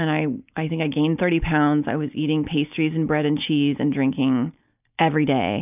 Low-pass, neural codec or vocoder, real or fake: 3.6 kHz; none; real